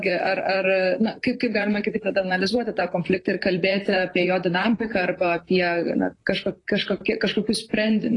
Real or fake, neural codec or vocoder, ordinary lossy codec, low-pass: fake; vocoder, 44.1 kHz, 128 mel bands, Pupu-Vocoder; AAC, 32 kbps; 10.8 kHz